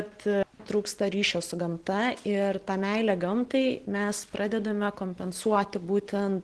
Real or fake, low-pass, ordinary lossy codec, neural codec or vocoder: real; 10.8 kHz; Opus, 16 kbps; none